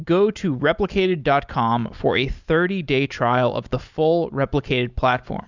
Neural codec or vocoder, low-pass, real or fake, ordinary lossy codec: none; 7.2 kHz; real; Opus, 64 kbps